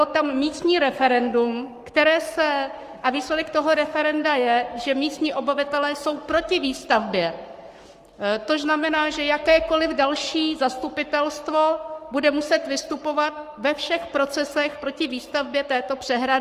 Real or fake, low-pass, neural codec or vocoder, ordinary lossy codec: fake; 14.4 kHz; codec, 44.1 kHz, 7.8 kbps, Pupu-Codec; Opus, 32 kbps